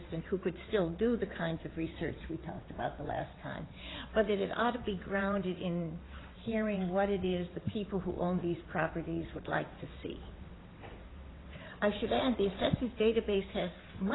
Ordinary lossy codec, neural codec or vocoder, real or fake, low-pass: AAC, 16 kbps; vocoder, 22.05 kHz, 80 mel bands, WaveNeXt; fake; 7.2 kHz